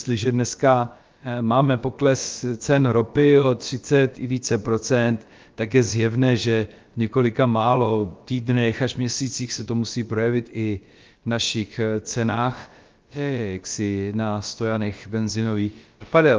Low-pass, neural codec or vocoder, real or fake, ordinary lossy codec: 7.2 kHz; codec, 16 kHz, about 1 kbps, DyCAST, with the encoder's durations; fake; Opus, 32 kbps